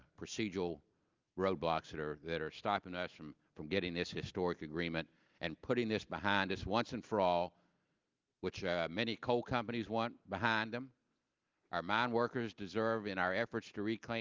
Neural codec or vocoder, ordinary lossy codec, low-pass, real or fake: none; Opus, 32 kbps; 7.2 kHz; real